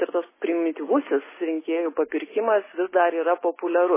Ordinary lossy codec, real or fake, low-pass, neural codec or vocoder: MP3, 16 kbps; real; 3.6 kHz; none